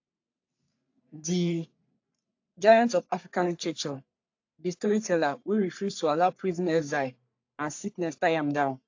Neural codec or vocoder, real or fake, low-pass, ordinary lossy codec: codec, 44.1 kHz, 3.4 kbps, Pupu-Codec; fake; 7.2 kHz; AAC, 48 kbps